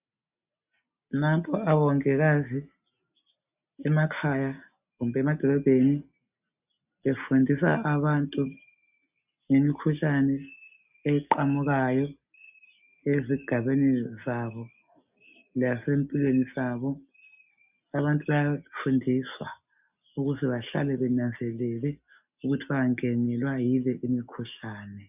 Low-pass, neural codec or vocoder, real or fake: 3.6 kHz; none; real